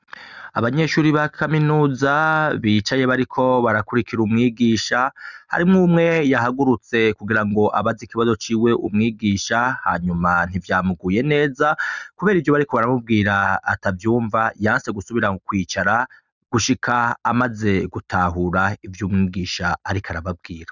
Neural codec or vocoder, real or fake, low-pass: none; real; 7.2 kHz